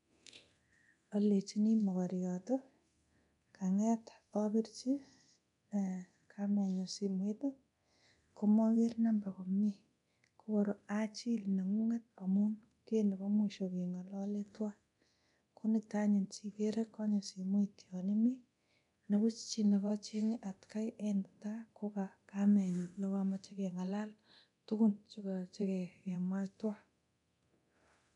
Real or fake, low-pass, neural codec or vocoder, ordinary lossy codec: fake; 10.8 kHz; codec, 24 kHz, 0.9 kbps, DualCodec; none